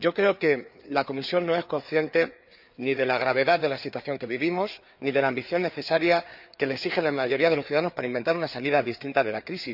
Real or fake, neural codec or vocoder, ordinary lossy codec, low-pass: fake; codec, 16 kHz, 4 kbps, FreqCodec, larger model; none; 5.4 kHz